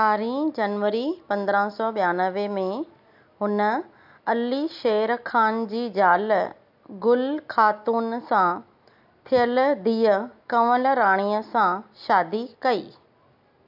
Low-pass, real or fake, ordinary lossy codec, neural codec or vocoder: 5.4 kHz; real; AAC, 48 kbps; none